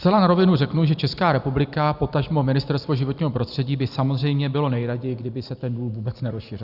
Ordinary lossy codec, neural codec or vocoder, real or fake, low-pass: Opus, 64 kbps; vocoder, 44.1 kHz, 128 mel bands every 256 samples, BigVGAN v2; fake; 5.4 kHz